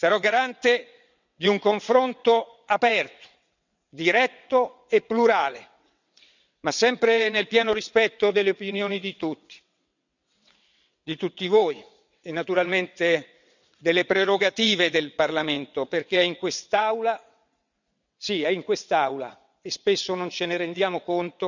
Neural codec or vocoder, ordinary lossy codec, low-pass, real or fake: vocoder, 22.05 kHz, 80 mel bands, WaveNeXt; none; 7.2 kHz; fake